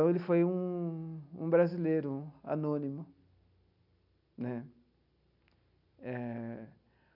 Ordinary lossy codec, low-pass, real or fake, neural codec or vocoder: none; 5.4 kHz; real; none